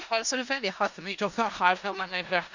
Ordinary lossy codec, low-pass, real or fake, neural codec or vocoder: none; 7.2 kHz; fake; codec, 16 kHz in and 24 kHz out, 0.4 kbps, LongCat-Audio-Codec, four codebook decoder